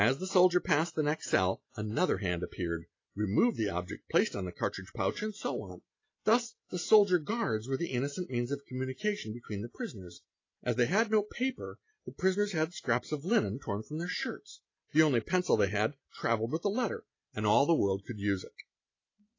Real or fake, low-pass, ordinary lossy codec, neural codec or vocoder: real; 7.2 kHz; AAC, 32 kbps; none